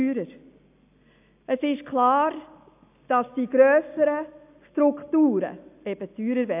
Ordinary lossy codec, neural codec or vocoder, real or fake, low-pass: AAC, 32 kbps; none; real; 3.6 kHz